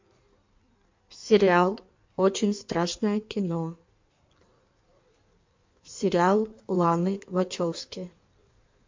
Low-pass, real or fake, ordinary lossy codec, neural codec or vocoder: 7.2 kHz; fake; MP3, 48 kbps; codec, 16 kHz in and 24 kHz out, 1.1 kbps, FireRedTTS-2 codec